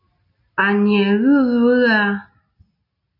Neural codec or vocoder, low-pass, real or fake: none; 5.4 kHz; real